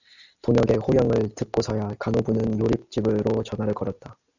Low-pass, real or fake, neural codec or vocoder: 7.2 kHz; real; none